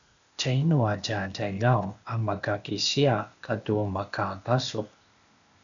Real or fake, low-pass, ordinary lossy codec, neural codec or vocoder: fake; 7.2 kHz; MP3, 96 kbps; codec, 16 kHz, 0.8 kbps, ZipCodec